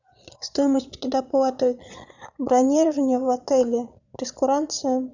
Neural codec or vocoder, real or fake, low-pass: vocoder, 22.05 kHz, 80 mel bands, Vocos; fake; 7.2 kHz